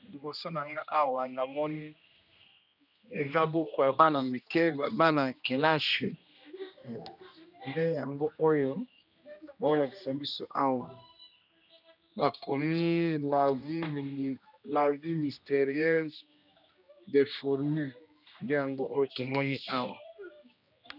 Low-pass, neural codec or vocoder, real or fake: 5.4 kHz; codec, 16 kHz, 1 kbps, X-Codec, HuBERT features, trained on general audio; fake